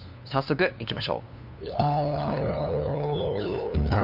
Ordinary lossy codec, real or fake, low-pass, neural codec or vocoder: none; fake; 5.4 kHz; codec, 16 kHz, 2 kbps, FunCodec, trained on LibriTTS, 25 frames a second